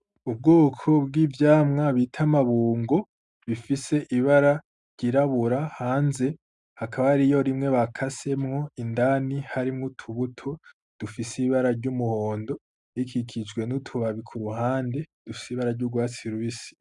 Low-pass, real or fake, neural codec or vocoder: 10.8 kHz; real; none